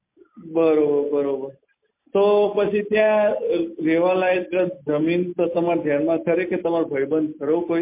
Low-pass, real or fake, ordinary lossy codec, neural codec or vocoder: 3.6 kHz; real; MP3, 24 kbps; none